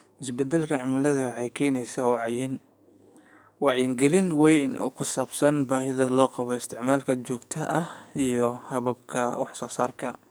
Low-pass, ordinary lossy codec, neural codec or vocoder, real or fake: none; none; codec, 44.1 kHz, 2.6 kbps, SNAC; fake